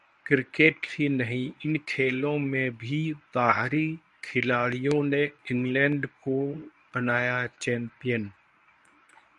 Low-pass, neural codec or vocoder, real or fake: 10.8 kHz; codec, 24 kHz, 0.9 kbps, WavTokenizer, medium speech release version 1; fake